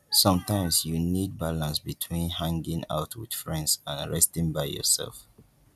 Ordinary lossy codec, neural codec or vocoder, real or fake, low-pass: none; none; real; 14.4 kHz